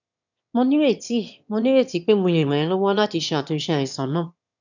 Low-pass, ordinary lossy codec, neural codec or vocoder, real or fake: 7.2 kHz; none; autoencoder, 22.05 kHz, a latent of 192 numbers a frame, VITS, trained on one speaker; fake